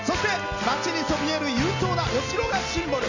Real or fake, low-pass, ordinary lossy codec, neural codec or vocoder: real; 7.2 kHz; AAC, 48 kbps; none